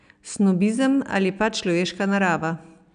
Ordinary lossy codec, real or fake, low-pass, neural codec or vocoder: none; real; 9.9 kHz; none